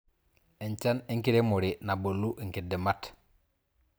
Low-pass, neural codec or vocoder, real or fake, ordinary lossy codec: none; none; real; none